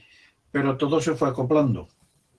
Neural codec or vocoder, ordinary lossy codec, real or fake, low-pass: none; Opus, 16 kbps; real; 10.8 kHz